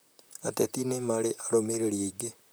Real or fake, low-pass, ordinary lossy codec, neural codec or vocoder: fake; none; none; vocoder, 44.1 kHz, 128 mel bands, Pupu-Vocoder